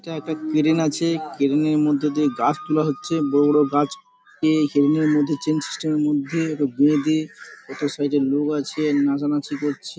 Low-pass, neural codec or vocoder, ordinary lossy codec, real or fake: none; none; none; real